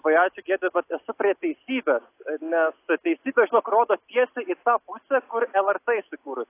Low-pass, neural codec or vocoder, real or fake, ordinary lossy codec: 3.6 kHz; none; real; AAC, 24 kbps